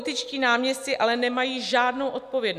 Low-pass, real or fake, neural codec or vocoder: 14.4 kHz; real; none